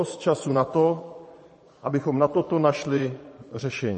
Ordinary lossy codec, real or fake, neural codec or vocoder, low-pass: MP3, 32 kbps; fake; vocoder, 22.05 kHz, 80 mel bands, Vocos; 9.9 kHz